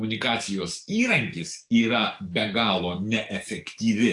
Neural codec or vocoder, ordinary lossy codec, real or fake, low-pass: codec, 44.1 kHz, 7.8 kbps, DAC; AAC, 64 kbps; fake; 10.8 kHz